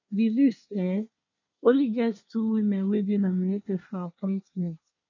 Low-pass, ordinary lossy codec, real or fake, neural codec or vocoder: 7.2 kHz; none; fake; codec, 24 kHz, 1 kbps, SNAC